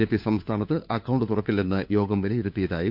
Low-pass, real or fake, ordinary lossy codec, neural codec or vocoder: 5.4 kHz; fake; none; codec, 16 kHz, 4 kbps, FunCodec, trained on LibriTTS, 50 frames a second